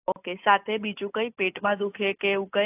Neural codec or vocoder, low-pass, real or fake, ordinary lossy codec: none; 3.6 kHz; real; AAC, 24 kbps